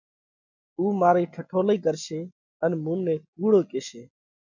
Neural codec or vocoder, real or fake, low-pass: none; real; 7.2 kHz